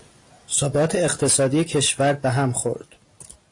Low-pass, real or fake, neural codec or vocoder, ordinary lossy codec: 10.8 kHz; real; none; AAC, 48 kbps